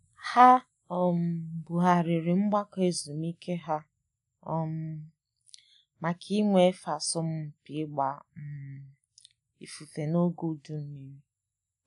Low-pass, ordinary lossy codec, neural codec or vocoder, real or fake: 10.8 kHz; none; none; real